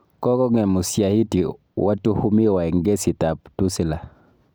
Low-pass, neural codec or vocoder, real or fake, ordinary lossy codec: none; none; real; none